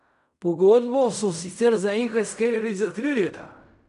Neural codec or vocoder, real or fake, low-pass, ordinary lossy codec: codec, 16 kHz in and 24 kHz out, 0.4 kbps, LongCat-Audio-Codec, fine tuned four codebook decoder; fake; 10.8 kHz; none